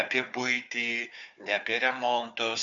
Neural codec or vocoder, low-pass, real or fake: codec, 16 kHz, 4 kbps, FunCodec, trained on Chinese and English, 50 frames a second; 7.2 kHz; fake